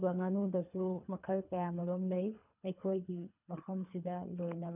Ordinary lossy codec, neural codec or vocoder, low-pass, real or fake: none; codec, 24 kHz, 3 kbps, HILCodec; 3.6 kHz; fake